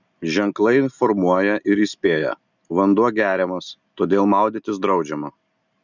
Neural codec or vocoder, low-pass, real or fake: none; 7.2 kHz; real